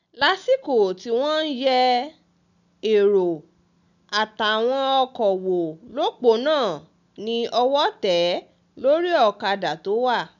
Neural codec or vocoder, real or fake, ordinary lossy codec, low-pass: none; real; none; 7.2 kHz